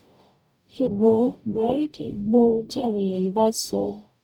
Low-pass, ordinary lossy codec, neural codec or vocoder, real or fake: 19.8 kHz; none; codec, 44.1 kHz, 0.9 kbps, DAC; fake